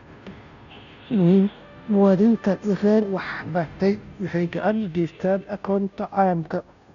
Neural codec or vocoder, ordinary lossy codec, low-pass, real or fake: codec, 16 kHz, 0.5 kbps, FunCodec, trained on Chinese and English, 25 frames a second; none; 7.2 kHz; fake